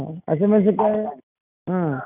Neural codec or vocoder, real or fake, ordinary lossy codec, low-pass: none; real; none; 3.6 kHz